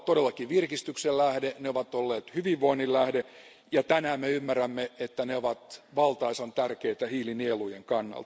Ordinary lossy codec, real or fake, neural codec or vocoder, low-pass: none; real; none; none